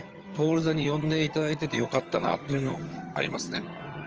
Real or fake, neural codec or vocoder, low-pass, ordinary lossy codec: fake; vocoder, 22.05 kHz, 80 mel bands, HiFi-GAN; 7.2 kHz; Opus, 24 kbps